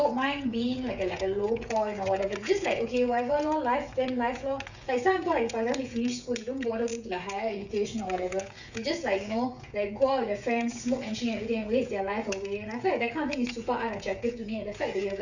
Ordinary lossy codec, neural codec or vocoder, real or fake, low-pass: none; codec, 16 kHz, 16 kbps, FreqCodec, smaller model; fake; 7.2 kHz